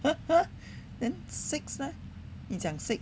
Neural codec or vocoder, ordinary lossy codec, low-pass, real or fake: none; none; none; real